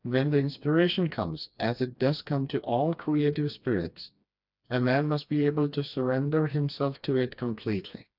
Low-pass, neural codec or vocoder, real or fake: 5.4 kHz; codec, 16 kHz, 2 kbps, FreqCodec, smaller model; fake